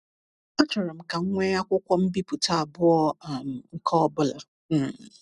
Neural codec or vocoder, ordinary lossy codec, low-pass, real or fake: none; none; 10.8 kHz; real